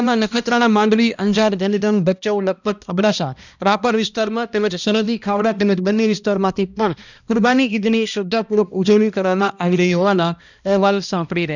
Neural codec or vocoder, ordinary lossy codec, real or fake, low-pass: codec, 16 kHz, 1 kbps, X-Codec, HuBERT features, trained on balanced general audio; none; fake; 7.2 kHz